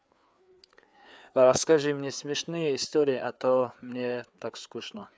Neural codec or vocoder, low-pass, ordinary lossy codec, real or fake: codec, 16 kHz, 4 kbps, FreqCodec, larger model; none; none; fake